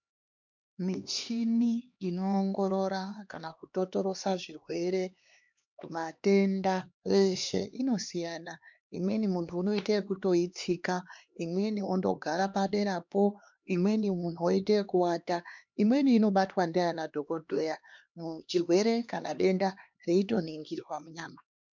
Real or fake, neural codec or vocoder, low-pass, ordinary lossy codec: fake; codec, 16 kHz, 2 kbps, X-Codec, HuBERT features, trained on LibriSpeech; 7.2 kHz; MP3, 64 kbps